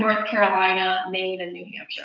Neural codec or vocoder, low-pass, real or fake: codec, 16 kHz, 16 kbps, FreqCodec, smaller model; 7.2 kHz; fake